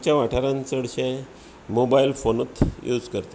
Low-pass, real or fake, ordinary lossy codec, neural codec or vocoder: none; real; none; none